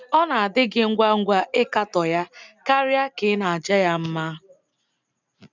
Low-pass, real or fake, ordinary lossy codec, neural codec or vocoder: 7.2 kHz; real; none; none